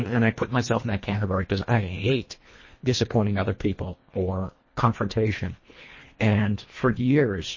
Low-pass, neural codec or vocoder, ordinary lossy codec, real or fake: 7.2 kHz; codec, 24 kHz, 1.5 kbps, HILCodec; MP3, 32 kbps; fake